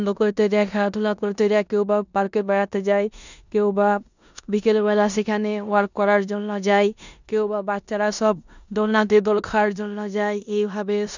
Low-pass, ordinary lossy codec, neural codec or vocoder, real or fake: 7.2 kHz; none; codec, 16 kHz in and 24 kHz out, 0.9 kbps, LongCat-Audio-Codec, four codebook decoder; fake